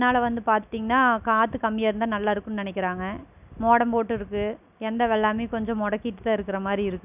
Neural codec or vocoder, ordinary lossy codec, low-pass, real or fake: none; none; 3.6 kHz; real